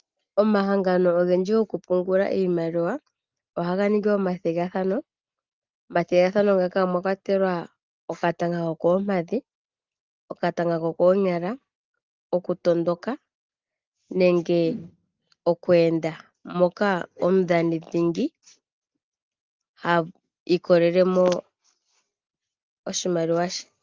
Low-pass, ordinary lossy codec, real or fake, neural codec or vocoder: 7.2 kHz; Opus, 32 kbps; real; none